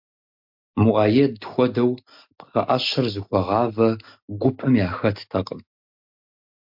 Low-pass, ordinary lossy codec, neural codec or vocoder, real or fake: 5.4 kHz; MP3, 48 kbps; none; real